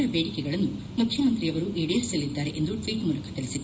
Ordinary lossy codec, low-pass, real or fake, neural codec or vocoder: none; none; real; none